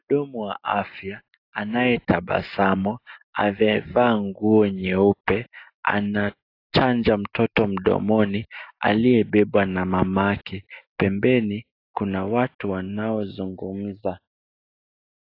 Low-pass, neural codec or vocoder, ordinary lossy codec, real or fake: 5.4 kHz; none; AAC, 32 kbps; real